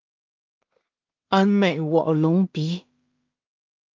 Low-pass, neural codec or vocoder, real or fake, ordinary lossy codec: 7.2 kHz; codec, 16 kHz in and 24 kHz out, 0.4 kbps, LongCat-Audio-Codec, two codebook decoder; fake; Opus, 32 kbps